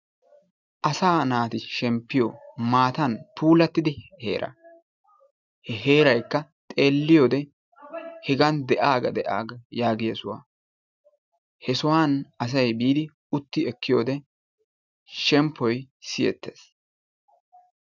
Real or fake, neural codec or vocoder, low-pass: real; none; 7.2 kHz